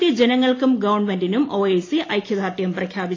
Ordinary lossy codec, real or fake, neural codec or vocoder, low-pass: AAC, 32 kbps; real; none; 7.2 kHz